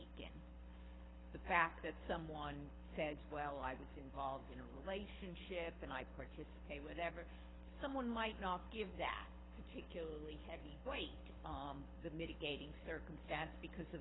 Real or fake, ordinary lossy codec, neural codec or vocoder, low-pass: fake; AAC, 16 kbps; codec, 24 kHz, 6 kbps, HILCodec; 7.2 kHz